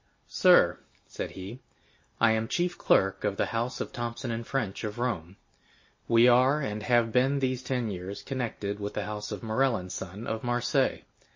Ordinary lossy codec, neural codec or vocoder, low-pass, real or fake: MP3, 32 kbps; none; 7.2 kHz; real